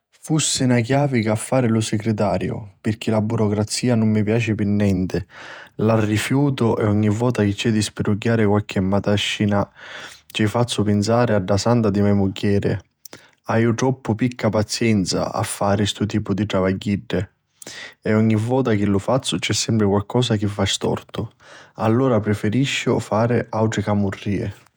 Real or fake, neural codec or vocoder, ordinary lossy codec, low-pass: fake; vocoder, 48 kHz, 128 mel bands, Vocos; none; none